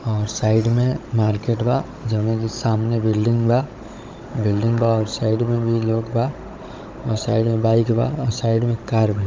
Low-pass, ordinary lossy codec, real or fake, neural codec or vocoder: 7.2 kHz; Opus, 24 kbps; fake; codec, 16 kHz, 16 kbps, FunCodec, trained on Chinese and English, 50 frames a second